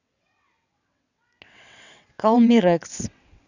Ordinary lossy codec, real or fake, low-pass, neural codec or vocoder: none; fake; 7.2 kHz; vocoder, 22.05 kHz, 80 mel bands, WaveNeXt